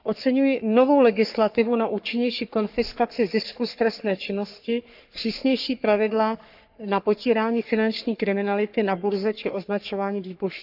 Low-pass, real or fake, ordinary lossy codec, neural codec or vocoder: 5.4 kHz; fake; none; codec, 44.1 kHz, 3.4 kbps, Pupu-Codec